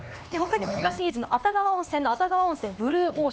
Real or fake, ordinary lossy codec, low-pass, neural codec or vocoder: fake; none; none; codec, 16 kHz, 2 kbps, X-Codec, HuBERT features, trained on LibriSpeech